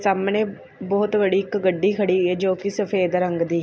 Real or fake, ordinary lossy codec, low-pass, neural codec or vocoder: real; none; none; none